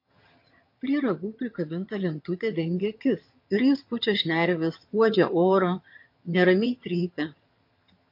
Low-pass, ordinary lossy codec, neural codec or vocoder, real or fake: 5.4 kHz; MP3, 32 kbps; vocoder, 22.05 kHz, 80 mel bands, HiFi-GAN; fake